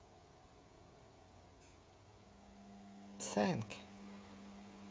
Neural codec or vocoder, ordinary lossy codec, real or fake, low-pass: codec, 16 kHz, 16 kbps, FreqCodec, smaller model; none; fake; none